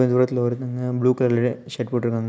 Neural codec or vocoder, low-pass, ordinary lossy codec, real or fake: none; none; none; real